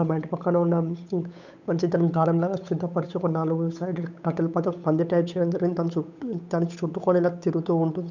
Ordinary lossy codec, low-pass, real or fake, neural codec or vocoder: none; 7.2 kHz; fake; codec, 16 kHz, 8 kbps, FunCodec, trained on Chinese and English, 25 frames a second